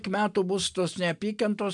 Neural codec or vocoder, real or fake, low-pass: none; real; 10.8 kHz